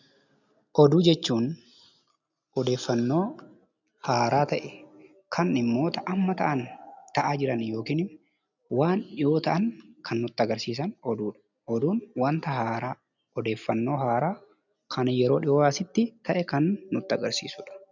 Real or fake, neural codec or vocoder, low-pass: real; none; 7.2 kHz